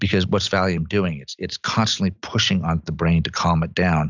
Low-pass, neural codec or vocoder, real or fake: 7.2 kHz; none; real